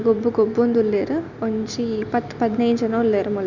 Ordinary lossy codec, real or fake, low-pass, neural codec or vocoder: none; real; 7.2 kHz; none